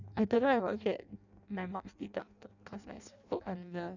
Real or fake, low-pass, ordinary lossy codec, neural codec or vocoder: fake; 7.2 kHz; none; codec, 16 kHz in and 24 kHz out, 0.6 kbps, FireRedTTS-2 codec